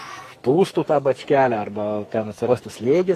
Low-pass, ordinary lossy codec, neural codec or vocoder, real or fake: 14.4 kHz; AAC, 48 kbps; codec, 44.1 kHz, 2.6 kbps, SNAC; fake